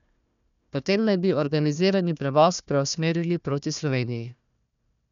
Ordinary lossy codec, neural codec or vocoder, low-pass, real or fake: none; codec, 16 kHz, 1 kbps, FunCodec, trained on Chinese and English, 50 frames a second; 7.2 kHz; fake